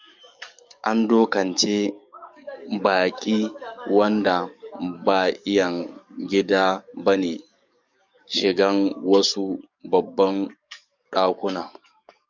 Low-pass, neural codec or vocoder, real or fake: 7.2 kHz; codec, 44.1 kHz, 7.8 kbps, DAC; fake